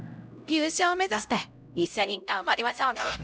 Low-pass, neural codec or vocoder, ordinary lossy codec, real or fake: none; codec, 16 kHz, 0.5 kbps, X-Codec, HuBERT features, trained on LibriSpeech; none; fake